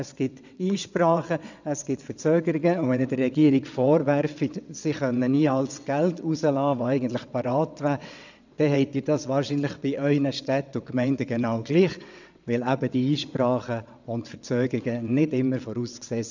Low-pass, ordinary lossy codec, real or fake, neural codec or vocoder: 7.2 kHz; none; fake; vocoder, 22.05 kHz, 80 mel bands, WaveNeXt